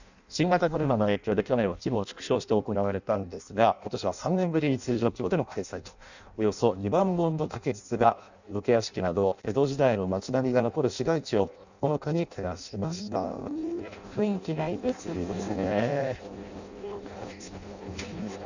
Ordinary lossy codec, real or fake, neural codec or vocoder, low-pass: none; fake; codec, 16 kHz in and 24 kHz out, 0.6 kbps, FireRedTTS-2 codec; 7.2 kHz